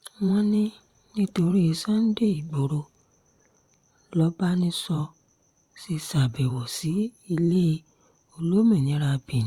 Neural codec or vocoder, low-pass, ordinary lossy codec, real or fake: vocoder, 44.1 kHz, 128 mel bands every 512 samples, BigVGAN v2; 19.8 kHz; Opus, 64 kbps; fake